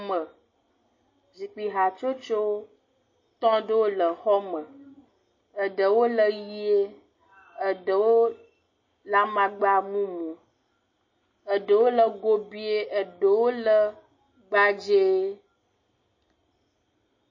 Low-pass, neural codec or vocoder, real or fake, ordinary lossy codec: 7.2 kHz; none; real; MP3, 32 kbps